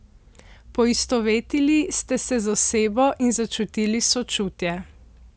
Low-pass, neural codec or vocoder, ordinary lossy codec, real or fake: none; none; none; real